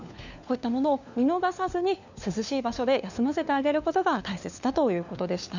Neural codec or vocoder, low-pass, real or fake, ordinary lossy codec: codec, 16 kHz, 4 kbps, FunCodec, trained on LibriTTS, 50 frames a second; 7.2 kHz; fake; none